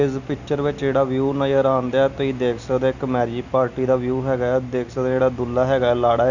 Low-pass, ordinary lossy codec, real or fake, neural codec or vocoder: 7.2 kHz; none; real; none